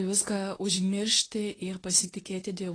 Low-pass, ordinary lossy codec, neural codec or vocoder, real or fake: 9.9 kHz; AAC, 32 kbps; codec, 24 kHz, 0.9 kbps, WavTokenizer, medium speech release version 2; fake